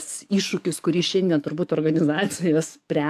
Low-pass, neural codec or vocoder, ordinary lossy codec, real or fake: 14.4 kHz; codec, 44.1 kHz, 7.8 kbps, DAC; MP3, 96 kbps; fake